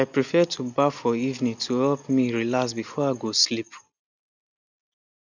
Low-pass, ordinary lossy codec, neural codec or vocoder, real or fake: 7.2 kHz; none; none; real